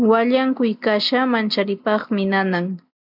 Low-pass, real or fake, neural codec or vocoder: 5.4 kHz; real; none